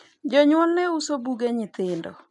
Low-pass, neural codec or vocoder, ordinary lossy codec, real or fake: 10.8 kHz; none; none; real